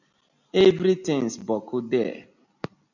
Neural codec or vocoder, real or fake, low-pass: none; real; 7.2 kHz